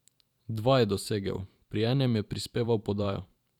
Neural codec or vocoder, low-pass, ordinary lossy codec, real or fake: vocoder, 44.1 kHz, 128 mel bands every 512 samples, BigVGAN v2; 19.8 kHz; none; fake